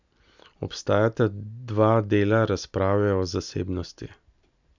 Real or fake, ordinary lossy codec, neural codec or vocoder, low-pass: real; none; none; 7.2 kHz